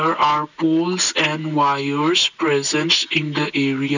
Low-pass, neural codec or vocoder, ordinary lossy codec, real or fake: 7.2 kHz; none; none; real